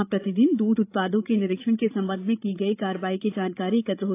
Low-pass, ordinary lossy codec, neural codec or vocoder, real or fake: 3.6 kHz; AAC, 24 kbps; codec, 16 kHz, 16 kbps, FreqCodec, larger model; fake